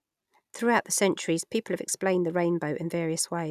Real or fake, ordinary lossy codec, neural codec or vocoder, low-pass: real; none; none; 14.4 kHz